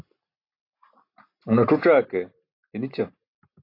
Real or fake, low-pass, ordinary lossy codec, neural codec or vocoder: real; 5.4 kHz; AAC, 32 kbps; none